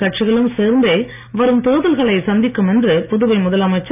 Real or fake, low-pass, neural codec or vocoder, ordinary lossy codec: real; 3.6 kHz; none; none